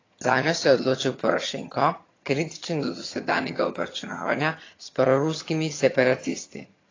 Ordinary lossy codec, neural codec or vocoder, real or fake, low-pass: AAC, 32 kbps; vocoder, 22.05 kHz, 80 mel bands, HiFi-GAN; fake; 7.2 kHz